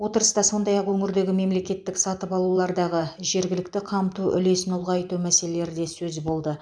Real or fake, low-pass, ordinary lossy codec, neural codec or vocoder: fake; 9.9 kHz; none; vocoder, 44.1 kHz, 128 mel bands every 512 samples, BigVGAN v2